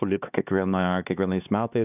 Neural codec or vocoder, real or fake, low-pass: codec, 16 kHz, 2 kbps, X-Codec, HuBERT features, trained on LibriSpeech; fake; 3.6 kHz